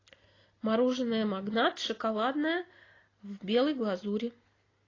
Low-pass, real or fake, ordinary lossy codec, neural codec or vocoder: 7.2 kHz; real; AAC, 32 kbps; none